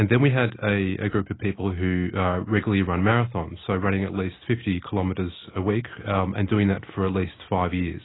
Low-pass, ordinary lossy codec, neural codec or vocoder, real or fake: 7.2 kHz; AAC, 16 kbps; none; real